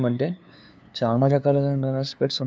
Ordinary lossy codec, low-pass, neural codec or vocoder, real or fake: none; none; codec, 16 kHz, 2 kbps, FunCodec, trained on LibriTTS, 25 frames a second; fake